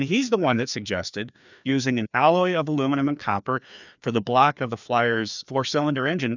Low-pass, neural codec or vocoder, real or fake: 7.2 kHz; codec, 16 kHz, 2 kbps, FreqCodec, larger model; fake